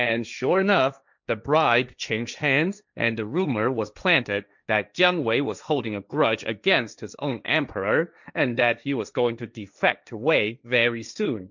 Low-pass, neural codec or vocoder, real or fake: 7.2 kHz; codec, 16 kHz, 1.1 kbps, Voila-Tokenizer; fake